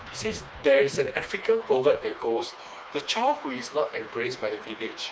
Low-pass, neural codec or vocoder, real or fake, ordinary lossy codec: none; codec, 16 kHz, 2 kbps, FreqCodec, smaller model; fake; none